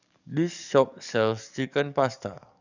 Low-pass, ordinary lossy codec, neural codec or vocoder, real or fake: 7.2 kHz; none; codec, 44.1 kHz, 7.8 kbps, DAC; fake